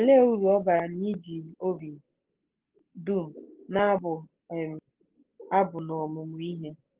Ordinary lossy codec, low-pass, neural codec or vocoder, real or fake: Opus, 16 kbps; 3.6 kHz; none; real